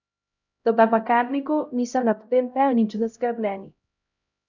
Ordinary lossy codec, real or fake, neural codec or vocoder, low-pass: none; fake; codec, 16 kHz, 0.5 kbps, X-Codec, HuBERT features, trained on LibriSpeech; 7.2 kHz